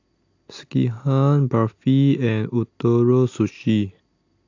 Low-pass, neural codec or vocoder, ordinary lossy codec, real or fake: 7.2 kHz; none; AAC, 48 kbps; real